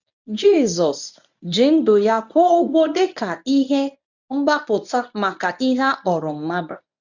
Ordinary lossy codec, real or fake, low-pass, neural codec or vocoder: none; fake; 7.2 kHz; codec, 24 kHz, 0.9 kbps, WavTokenizer, medium speech release version 1